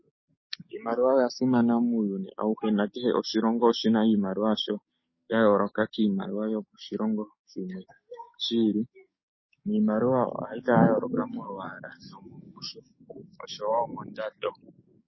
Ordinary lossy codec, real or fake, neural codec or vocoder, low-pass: MP3, 24 kbps; fake; codec, 44.1 kHz, 7.8 kbps, DAC; 7.2 kHz